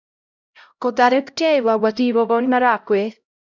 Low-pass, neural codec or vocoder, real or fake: 7.2 kHz; codec, 16 kHz, 0.5 kbps, X-Codec, HuBERT features, trained on LibriSpeech; fake